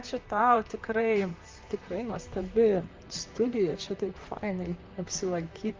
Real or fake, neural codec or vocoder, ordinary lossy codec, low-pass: fake; vocoder, 44.1 kHz, 128 mel bands, Pupu-Vocoder; Opus, 32 kbps; 7.2 kHz